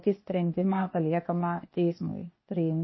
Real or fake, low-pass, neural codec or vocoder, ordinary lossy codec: fake; 7.2 kHz; codec, 16 kHz, 0.8 kbps, ZipCodec; MP3, 24 kbps